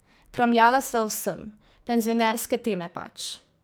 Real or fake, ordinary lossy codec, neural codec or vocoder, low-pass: fake; none; codec, 44.1 kHz, 2.6 kbps, SNAC; none